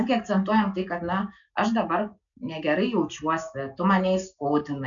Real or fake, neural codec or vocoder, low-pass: real; none; 7.2 kHz